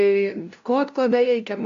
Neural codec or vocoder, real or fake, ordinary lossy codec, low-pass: codec, 16 kHz, 0.5 kbps, FunCodec, trained on LibriTTS, 25 frames a second; fake; MP3, 48 kbps; 7.2 kHz